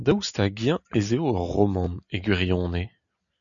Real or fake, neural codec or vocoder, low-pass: real; none; 7.2 kHz